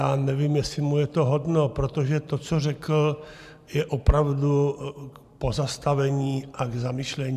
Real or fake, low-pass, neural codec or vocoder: fake; 14.4 kHz; vocoder, 44.1 kHz, 128 mel bands every 512 samples, BigVGAN v2